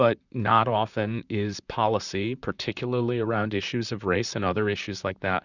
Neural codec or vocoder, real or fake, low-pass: vocoder, 44.1 kHz, 128 mel bands, Pupu-Vocoder; fake; 7.2 kHz